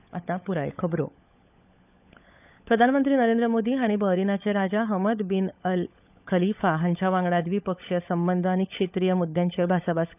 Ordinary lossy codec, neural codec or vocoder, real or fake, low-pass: none; codec, 16 kHz, 16 kbps, FunCodec, trained on LibriTTS, 50 frames a second; fake; 3.6 kHz